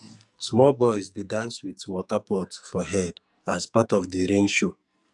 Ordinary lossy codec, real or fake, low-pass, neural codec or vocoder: none; fake; 10.8 kHz; codec, 44.1 kHz, 2.6 kbps, SNAC